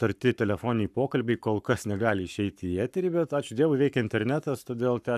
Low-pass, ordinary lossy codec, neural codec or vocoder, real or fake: 14.4 kHz; AAC, 96 kbps; codec, 44.1 kHz, 7.8 kbps, Pupu-Codec; fake